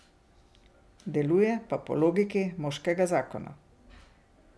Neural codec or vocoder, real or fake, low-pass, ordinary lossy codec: none; real; none; none